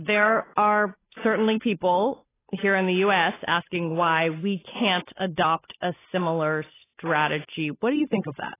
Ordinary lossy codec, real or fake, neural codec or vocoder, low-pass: AAC, 16 kbps; fake; codec, 16 kHz, 8 kbps, FunCodec, trained on Chinese and English, 25 frames a second; 3.6 kHz